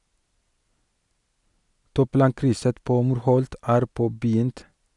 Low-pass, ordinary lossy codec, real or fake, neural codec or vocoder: 10.8 kHz; none; real; none